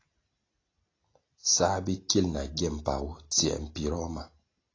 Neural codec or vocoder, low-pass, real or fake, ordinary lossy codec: none; 7.2 kHz; real; AAC, 32 kbps